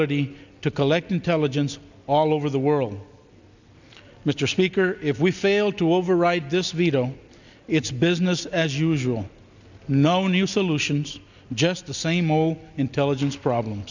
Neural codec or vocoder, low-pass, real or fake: none; 7.2 kHz; real